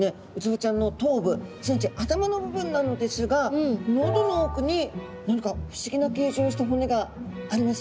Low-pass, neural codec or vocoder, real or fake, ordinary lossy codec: none; none; real; none